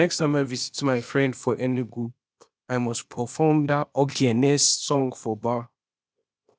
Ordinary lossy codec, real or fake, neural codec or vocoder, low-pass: none; fake; codec, 16 kHz, 0.8 kbps, ZipCodec; none